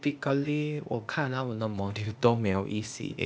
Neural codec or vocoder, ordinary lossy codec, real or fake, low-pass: codec, 16 kHz, 0.8 kbps, ZipCodec; none; fake; none